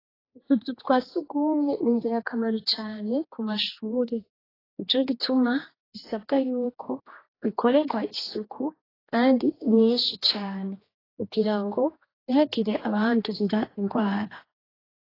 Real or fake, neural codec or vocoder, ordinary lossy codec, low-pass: fake; codec, 16 kHz, 2 kbps, X-Codec, HuBERT features, trained on general audio; AAC, 24 kbps; 5.4 kHz